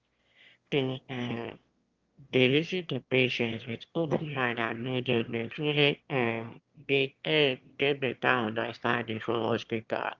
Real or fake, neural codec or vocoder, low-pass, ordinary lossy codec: fake; autoencoder, 22.05 kHz, a latent of 192 numbers a frame, VITS, trained on one speaker; 7.2 kHz; Opus, 32 kbps